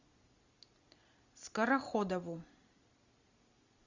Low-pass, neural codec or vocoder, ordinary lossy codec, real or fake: 7.2 kHz; none; Opus, 64 kbps; real